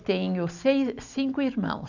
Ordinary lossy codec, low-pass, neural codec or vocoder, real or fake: none; 7.2 kHz; none; real